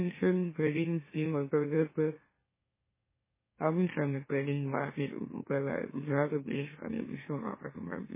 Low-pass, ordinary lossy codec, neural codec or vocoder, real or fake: 3.6 kHz; MP3, 16 kbps; autoencoder, 44.1 kHz, a latent of 192 numbers a frame, MeloTTS; fake